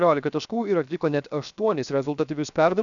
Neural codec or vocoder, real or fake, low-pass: codec, 16 kHz, 0.7 kbps, FocalCodec; fake; 7.2 kHz